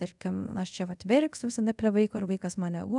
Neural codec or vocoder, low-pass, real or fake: codec, 24 kHz, 0.5 kbps, DualCodec; 10.8 kHz; fake